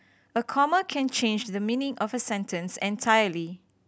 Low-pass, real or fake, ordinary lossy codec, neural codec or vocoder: none; real; none; none